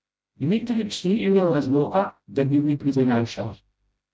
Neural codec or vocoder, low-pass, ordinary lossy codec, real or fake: codec, 16 kHz, 0.5 kbps, FreqCodec, smaller model; none; none; fake